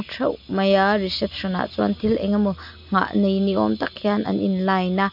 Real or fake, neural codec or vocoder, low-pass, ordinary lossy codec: real; none; 5.4 kHz; none